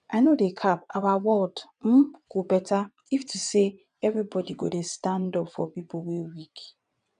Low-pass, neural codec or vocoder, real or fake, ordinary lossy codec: 9.9 kHz; vocoder, 22.05 kHz, 80 mel bands, WaveNeXt; fake; none